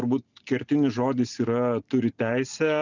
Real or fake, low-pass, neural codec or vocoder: real; 7.2 kHz; none